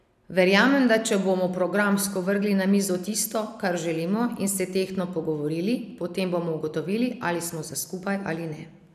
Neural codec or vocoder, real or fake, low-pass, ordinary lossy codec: none; real; 14.4 kHz; none